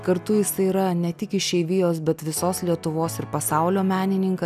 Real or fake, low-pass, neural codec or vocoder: real; 14.4 kHz; none